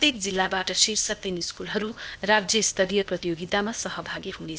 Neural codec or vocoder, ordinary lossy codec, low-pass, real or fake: codec, 16 kHz, 0.8 kbps, ZipCodec; none; none; fake